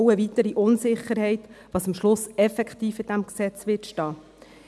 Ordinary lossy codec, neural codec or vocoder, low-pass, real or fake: none; none; none; real